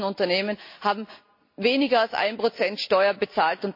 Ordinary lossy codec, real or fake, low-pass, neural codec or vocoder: MP3, 32 kbps; real; 5.4 kHz; none